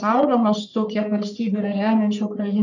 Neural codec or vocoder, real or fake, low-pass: codec, 44.1 kHz, 3.4 kbps, Pupu-Codec; fake; 7.2 kHz